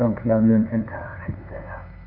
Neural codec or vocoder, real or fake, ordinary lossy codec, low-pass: codec, 16 kHz, 2 kbps, FunCodec, trained on LibriTTS, 25 frames a second; fake; none; 5.4 kHz